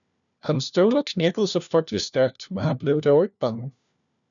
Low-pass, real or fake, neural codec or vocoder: 7.2 kHz; fake; codec, 16 kHz, 1 kbps, FunCodec, trained on LibriTTS, 50 frames a second